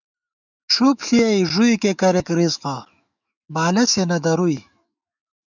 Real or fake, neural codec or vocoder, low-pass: fake; autoencoder, 48 kHz, 128 numbers a frame, DAC-VAE, trained on Japanese speech; 7.2 kHz